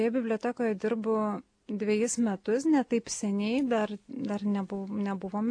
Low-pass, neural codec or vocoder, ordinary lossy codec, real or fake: 9.9 kHz; none; AAC, 48 kbps; real